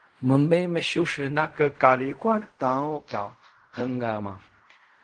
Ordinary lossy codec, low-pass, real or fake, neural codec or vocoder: Opus, 24 kbps; 9.9 kHz; fake; codec, 16 kHz in and 24 kHz out, 0.4 kbps, LongCat-Audio-Codec, fine tuned four codebook decoder